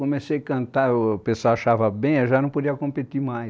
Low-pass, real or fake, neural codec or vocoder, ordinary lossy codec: none; real; none; none